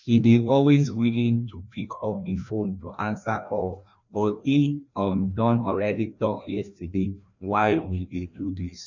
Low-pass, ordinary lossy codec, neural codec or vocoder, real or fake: 7.2 kHz; none; codec, 16 kHz, 1 kbps, FreqCodec, larger model; fake